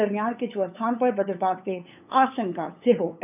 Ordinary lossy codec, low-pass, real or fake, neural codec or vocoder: none; 3.6 kHz; fake; codec, 16 kHz, 8 kbps, FunCodec, trained on LibriTTS, 25 frames a second